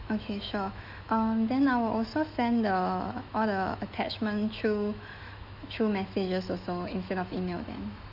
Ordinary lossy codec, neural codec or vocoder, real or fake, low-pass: MP3, 48 kbps; autoencoder, 48 kHz, 128 numbers a frame, DAC-VAE, trained on Japanese speech; fake; 5.4 kHz